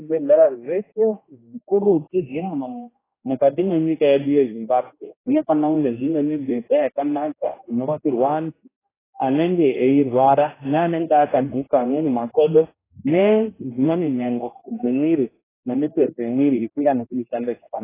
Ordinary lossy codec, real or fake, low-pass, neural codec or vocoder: AAC, 16 kbps; fake; 3.6 kHz; codec, 16 kHz, 1 kbps, X-Codec, HuBERT features, trained on general audio